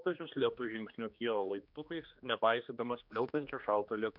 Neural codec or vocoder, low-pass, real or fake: codec, 16 kHz, 2 kbps, X-Codec, HuBERT features, trained on general audio; 5.4 kHz; fake